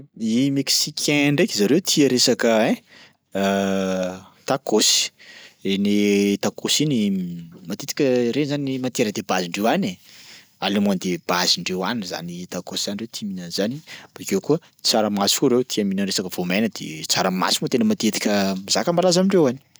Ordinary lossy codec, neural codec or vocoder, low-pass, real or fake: none; none; none; real